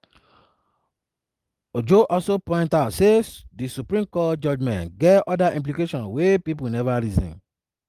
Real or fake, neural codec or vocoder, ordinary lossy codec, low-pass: real; none; Opus, 32 kbps; 14.4 kHz